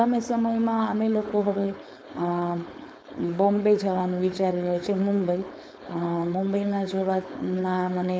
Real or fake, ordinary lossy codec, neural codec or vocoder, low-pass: fake; none; codec, 16 kHz, 4.8 kbps, FACodec; none